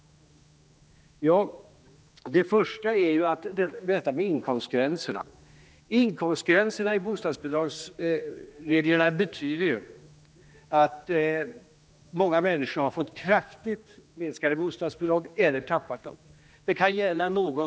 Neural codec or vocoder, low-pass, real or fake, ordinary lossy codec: codec, 16 kHz, 2 kbps, X-Codec, HuBERT features, trained on general audio; none; fake; none